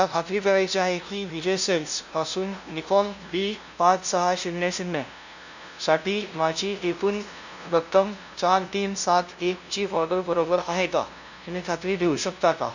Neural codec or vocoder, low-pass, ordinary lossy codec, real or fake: codec, 16 kHz, 0.5 kbps, FunCodec, trained on LibriTTS, 25 frames a second; 7.2 kHz; none; fake